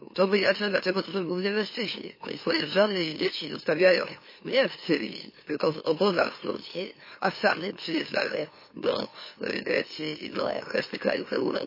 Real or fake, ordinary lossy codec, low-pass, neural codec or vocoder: fake; MP3, 24 kbps; 5.4 kHz; autoencoder, 44.1 kHz, a latent of 192 numbers a frame, MeloTTS